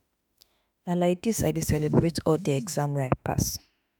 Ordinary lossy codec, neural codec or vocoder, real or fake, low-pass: none; autoencoder, 48 kHz, 32 numbers a frame, DAC-VAE, trained on Japanese speech; fake; none